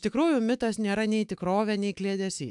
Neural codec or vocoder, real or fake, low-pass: autoencoder, 48 kHz, 128 numbers a frame, DAC-VAE, trained on Japanese speech; fake; 10.8 kHz